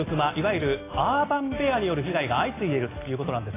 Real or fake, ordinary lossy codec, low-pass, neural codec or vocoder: real; AAC, 16 kbps; 3.6 kHz; none